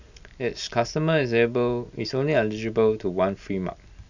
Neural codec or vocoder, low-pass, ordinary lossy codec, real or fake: none; 7.2 kHz; none; real